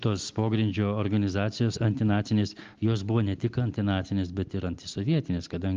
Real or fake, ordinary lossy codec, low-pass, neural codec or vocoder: real; Opus, 32 kbps; 7.2 kHz; none